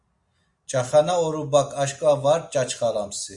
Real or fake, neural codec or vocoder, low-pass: real; none; 10.8 kHz